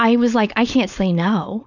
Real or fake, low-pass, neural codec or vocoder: fake; 7.2 kHz; codec, 16 kHz, 4.8 kbps, FACodec